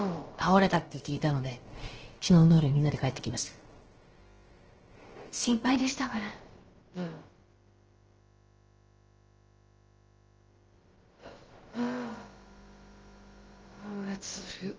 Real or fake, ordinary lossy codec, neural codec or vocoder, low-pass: fake; Opus, 16 kbps; codec, 16 kHz, about 1 kbps, DyCAST, with the encoder's durations; 7.2 kHz